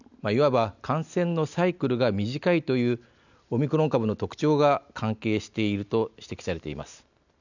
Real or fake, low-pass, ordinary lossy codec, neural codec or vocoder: real; 7.2 kHz; none; none